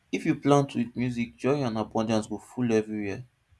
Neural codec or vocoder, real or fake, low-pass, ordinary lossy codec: none; real; none; none